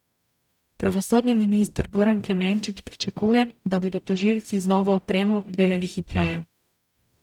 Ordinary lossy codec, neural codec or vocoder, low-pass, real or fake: none; codec, 44.1 kHz, 0.9 kbps, DAC; 19.8 kHz; fake